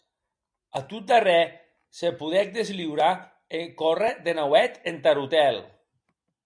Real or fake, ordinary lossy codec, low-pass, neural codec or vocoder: real; MP3, 64 kbps; 9.9 kHz; none